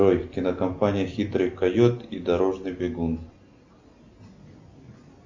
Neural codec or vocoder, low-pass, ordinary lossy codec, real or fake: none; 7.2 kHz; MP3, 64 kbps; real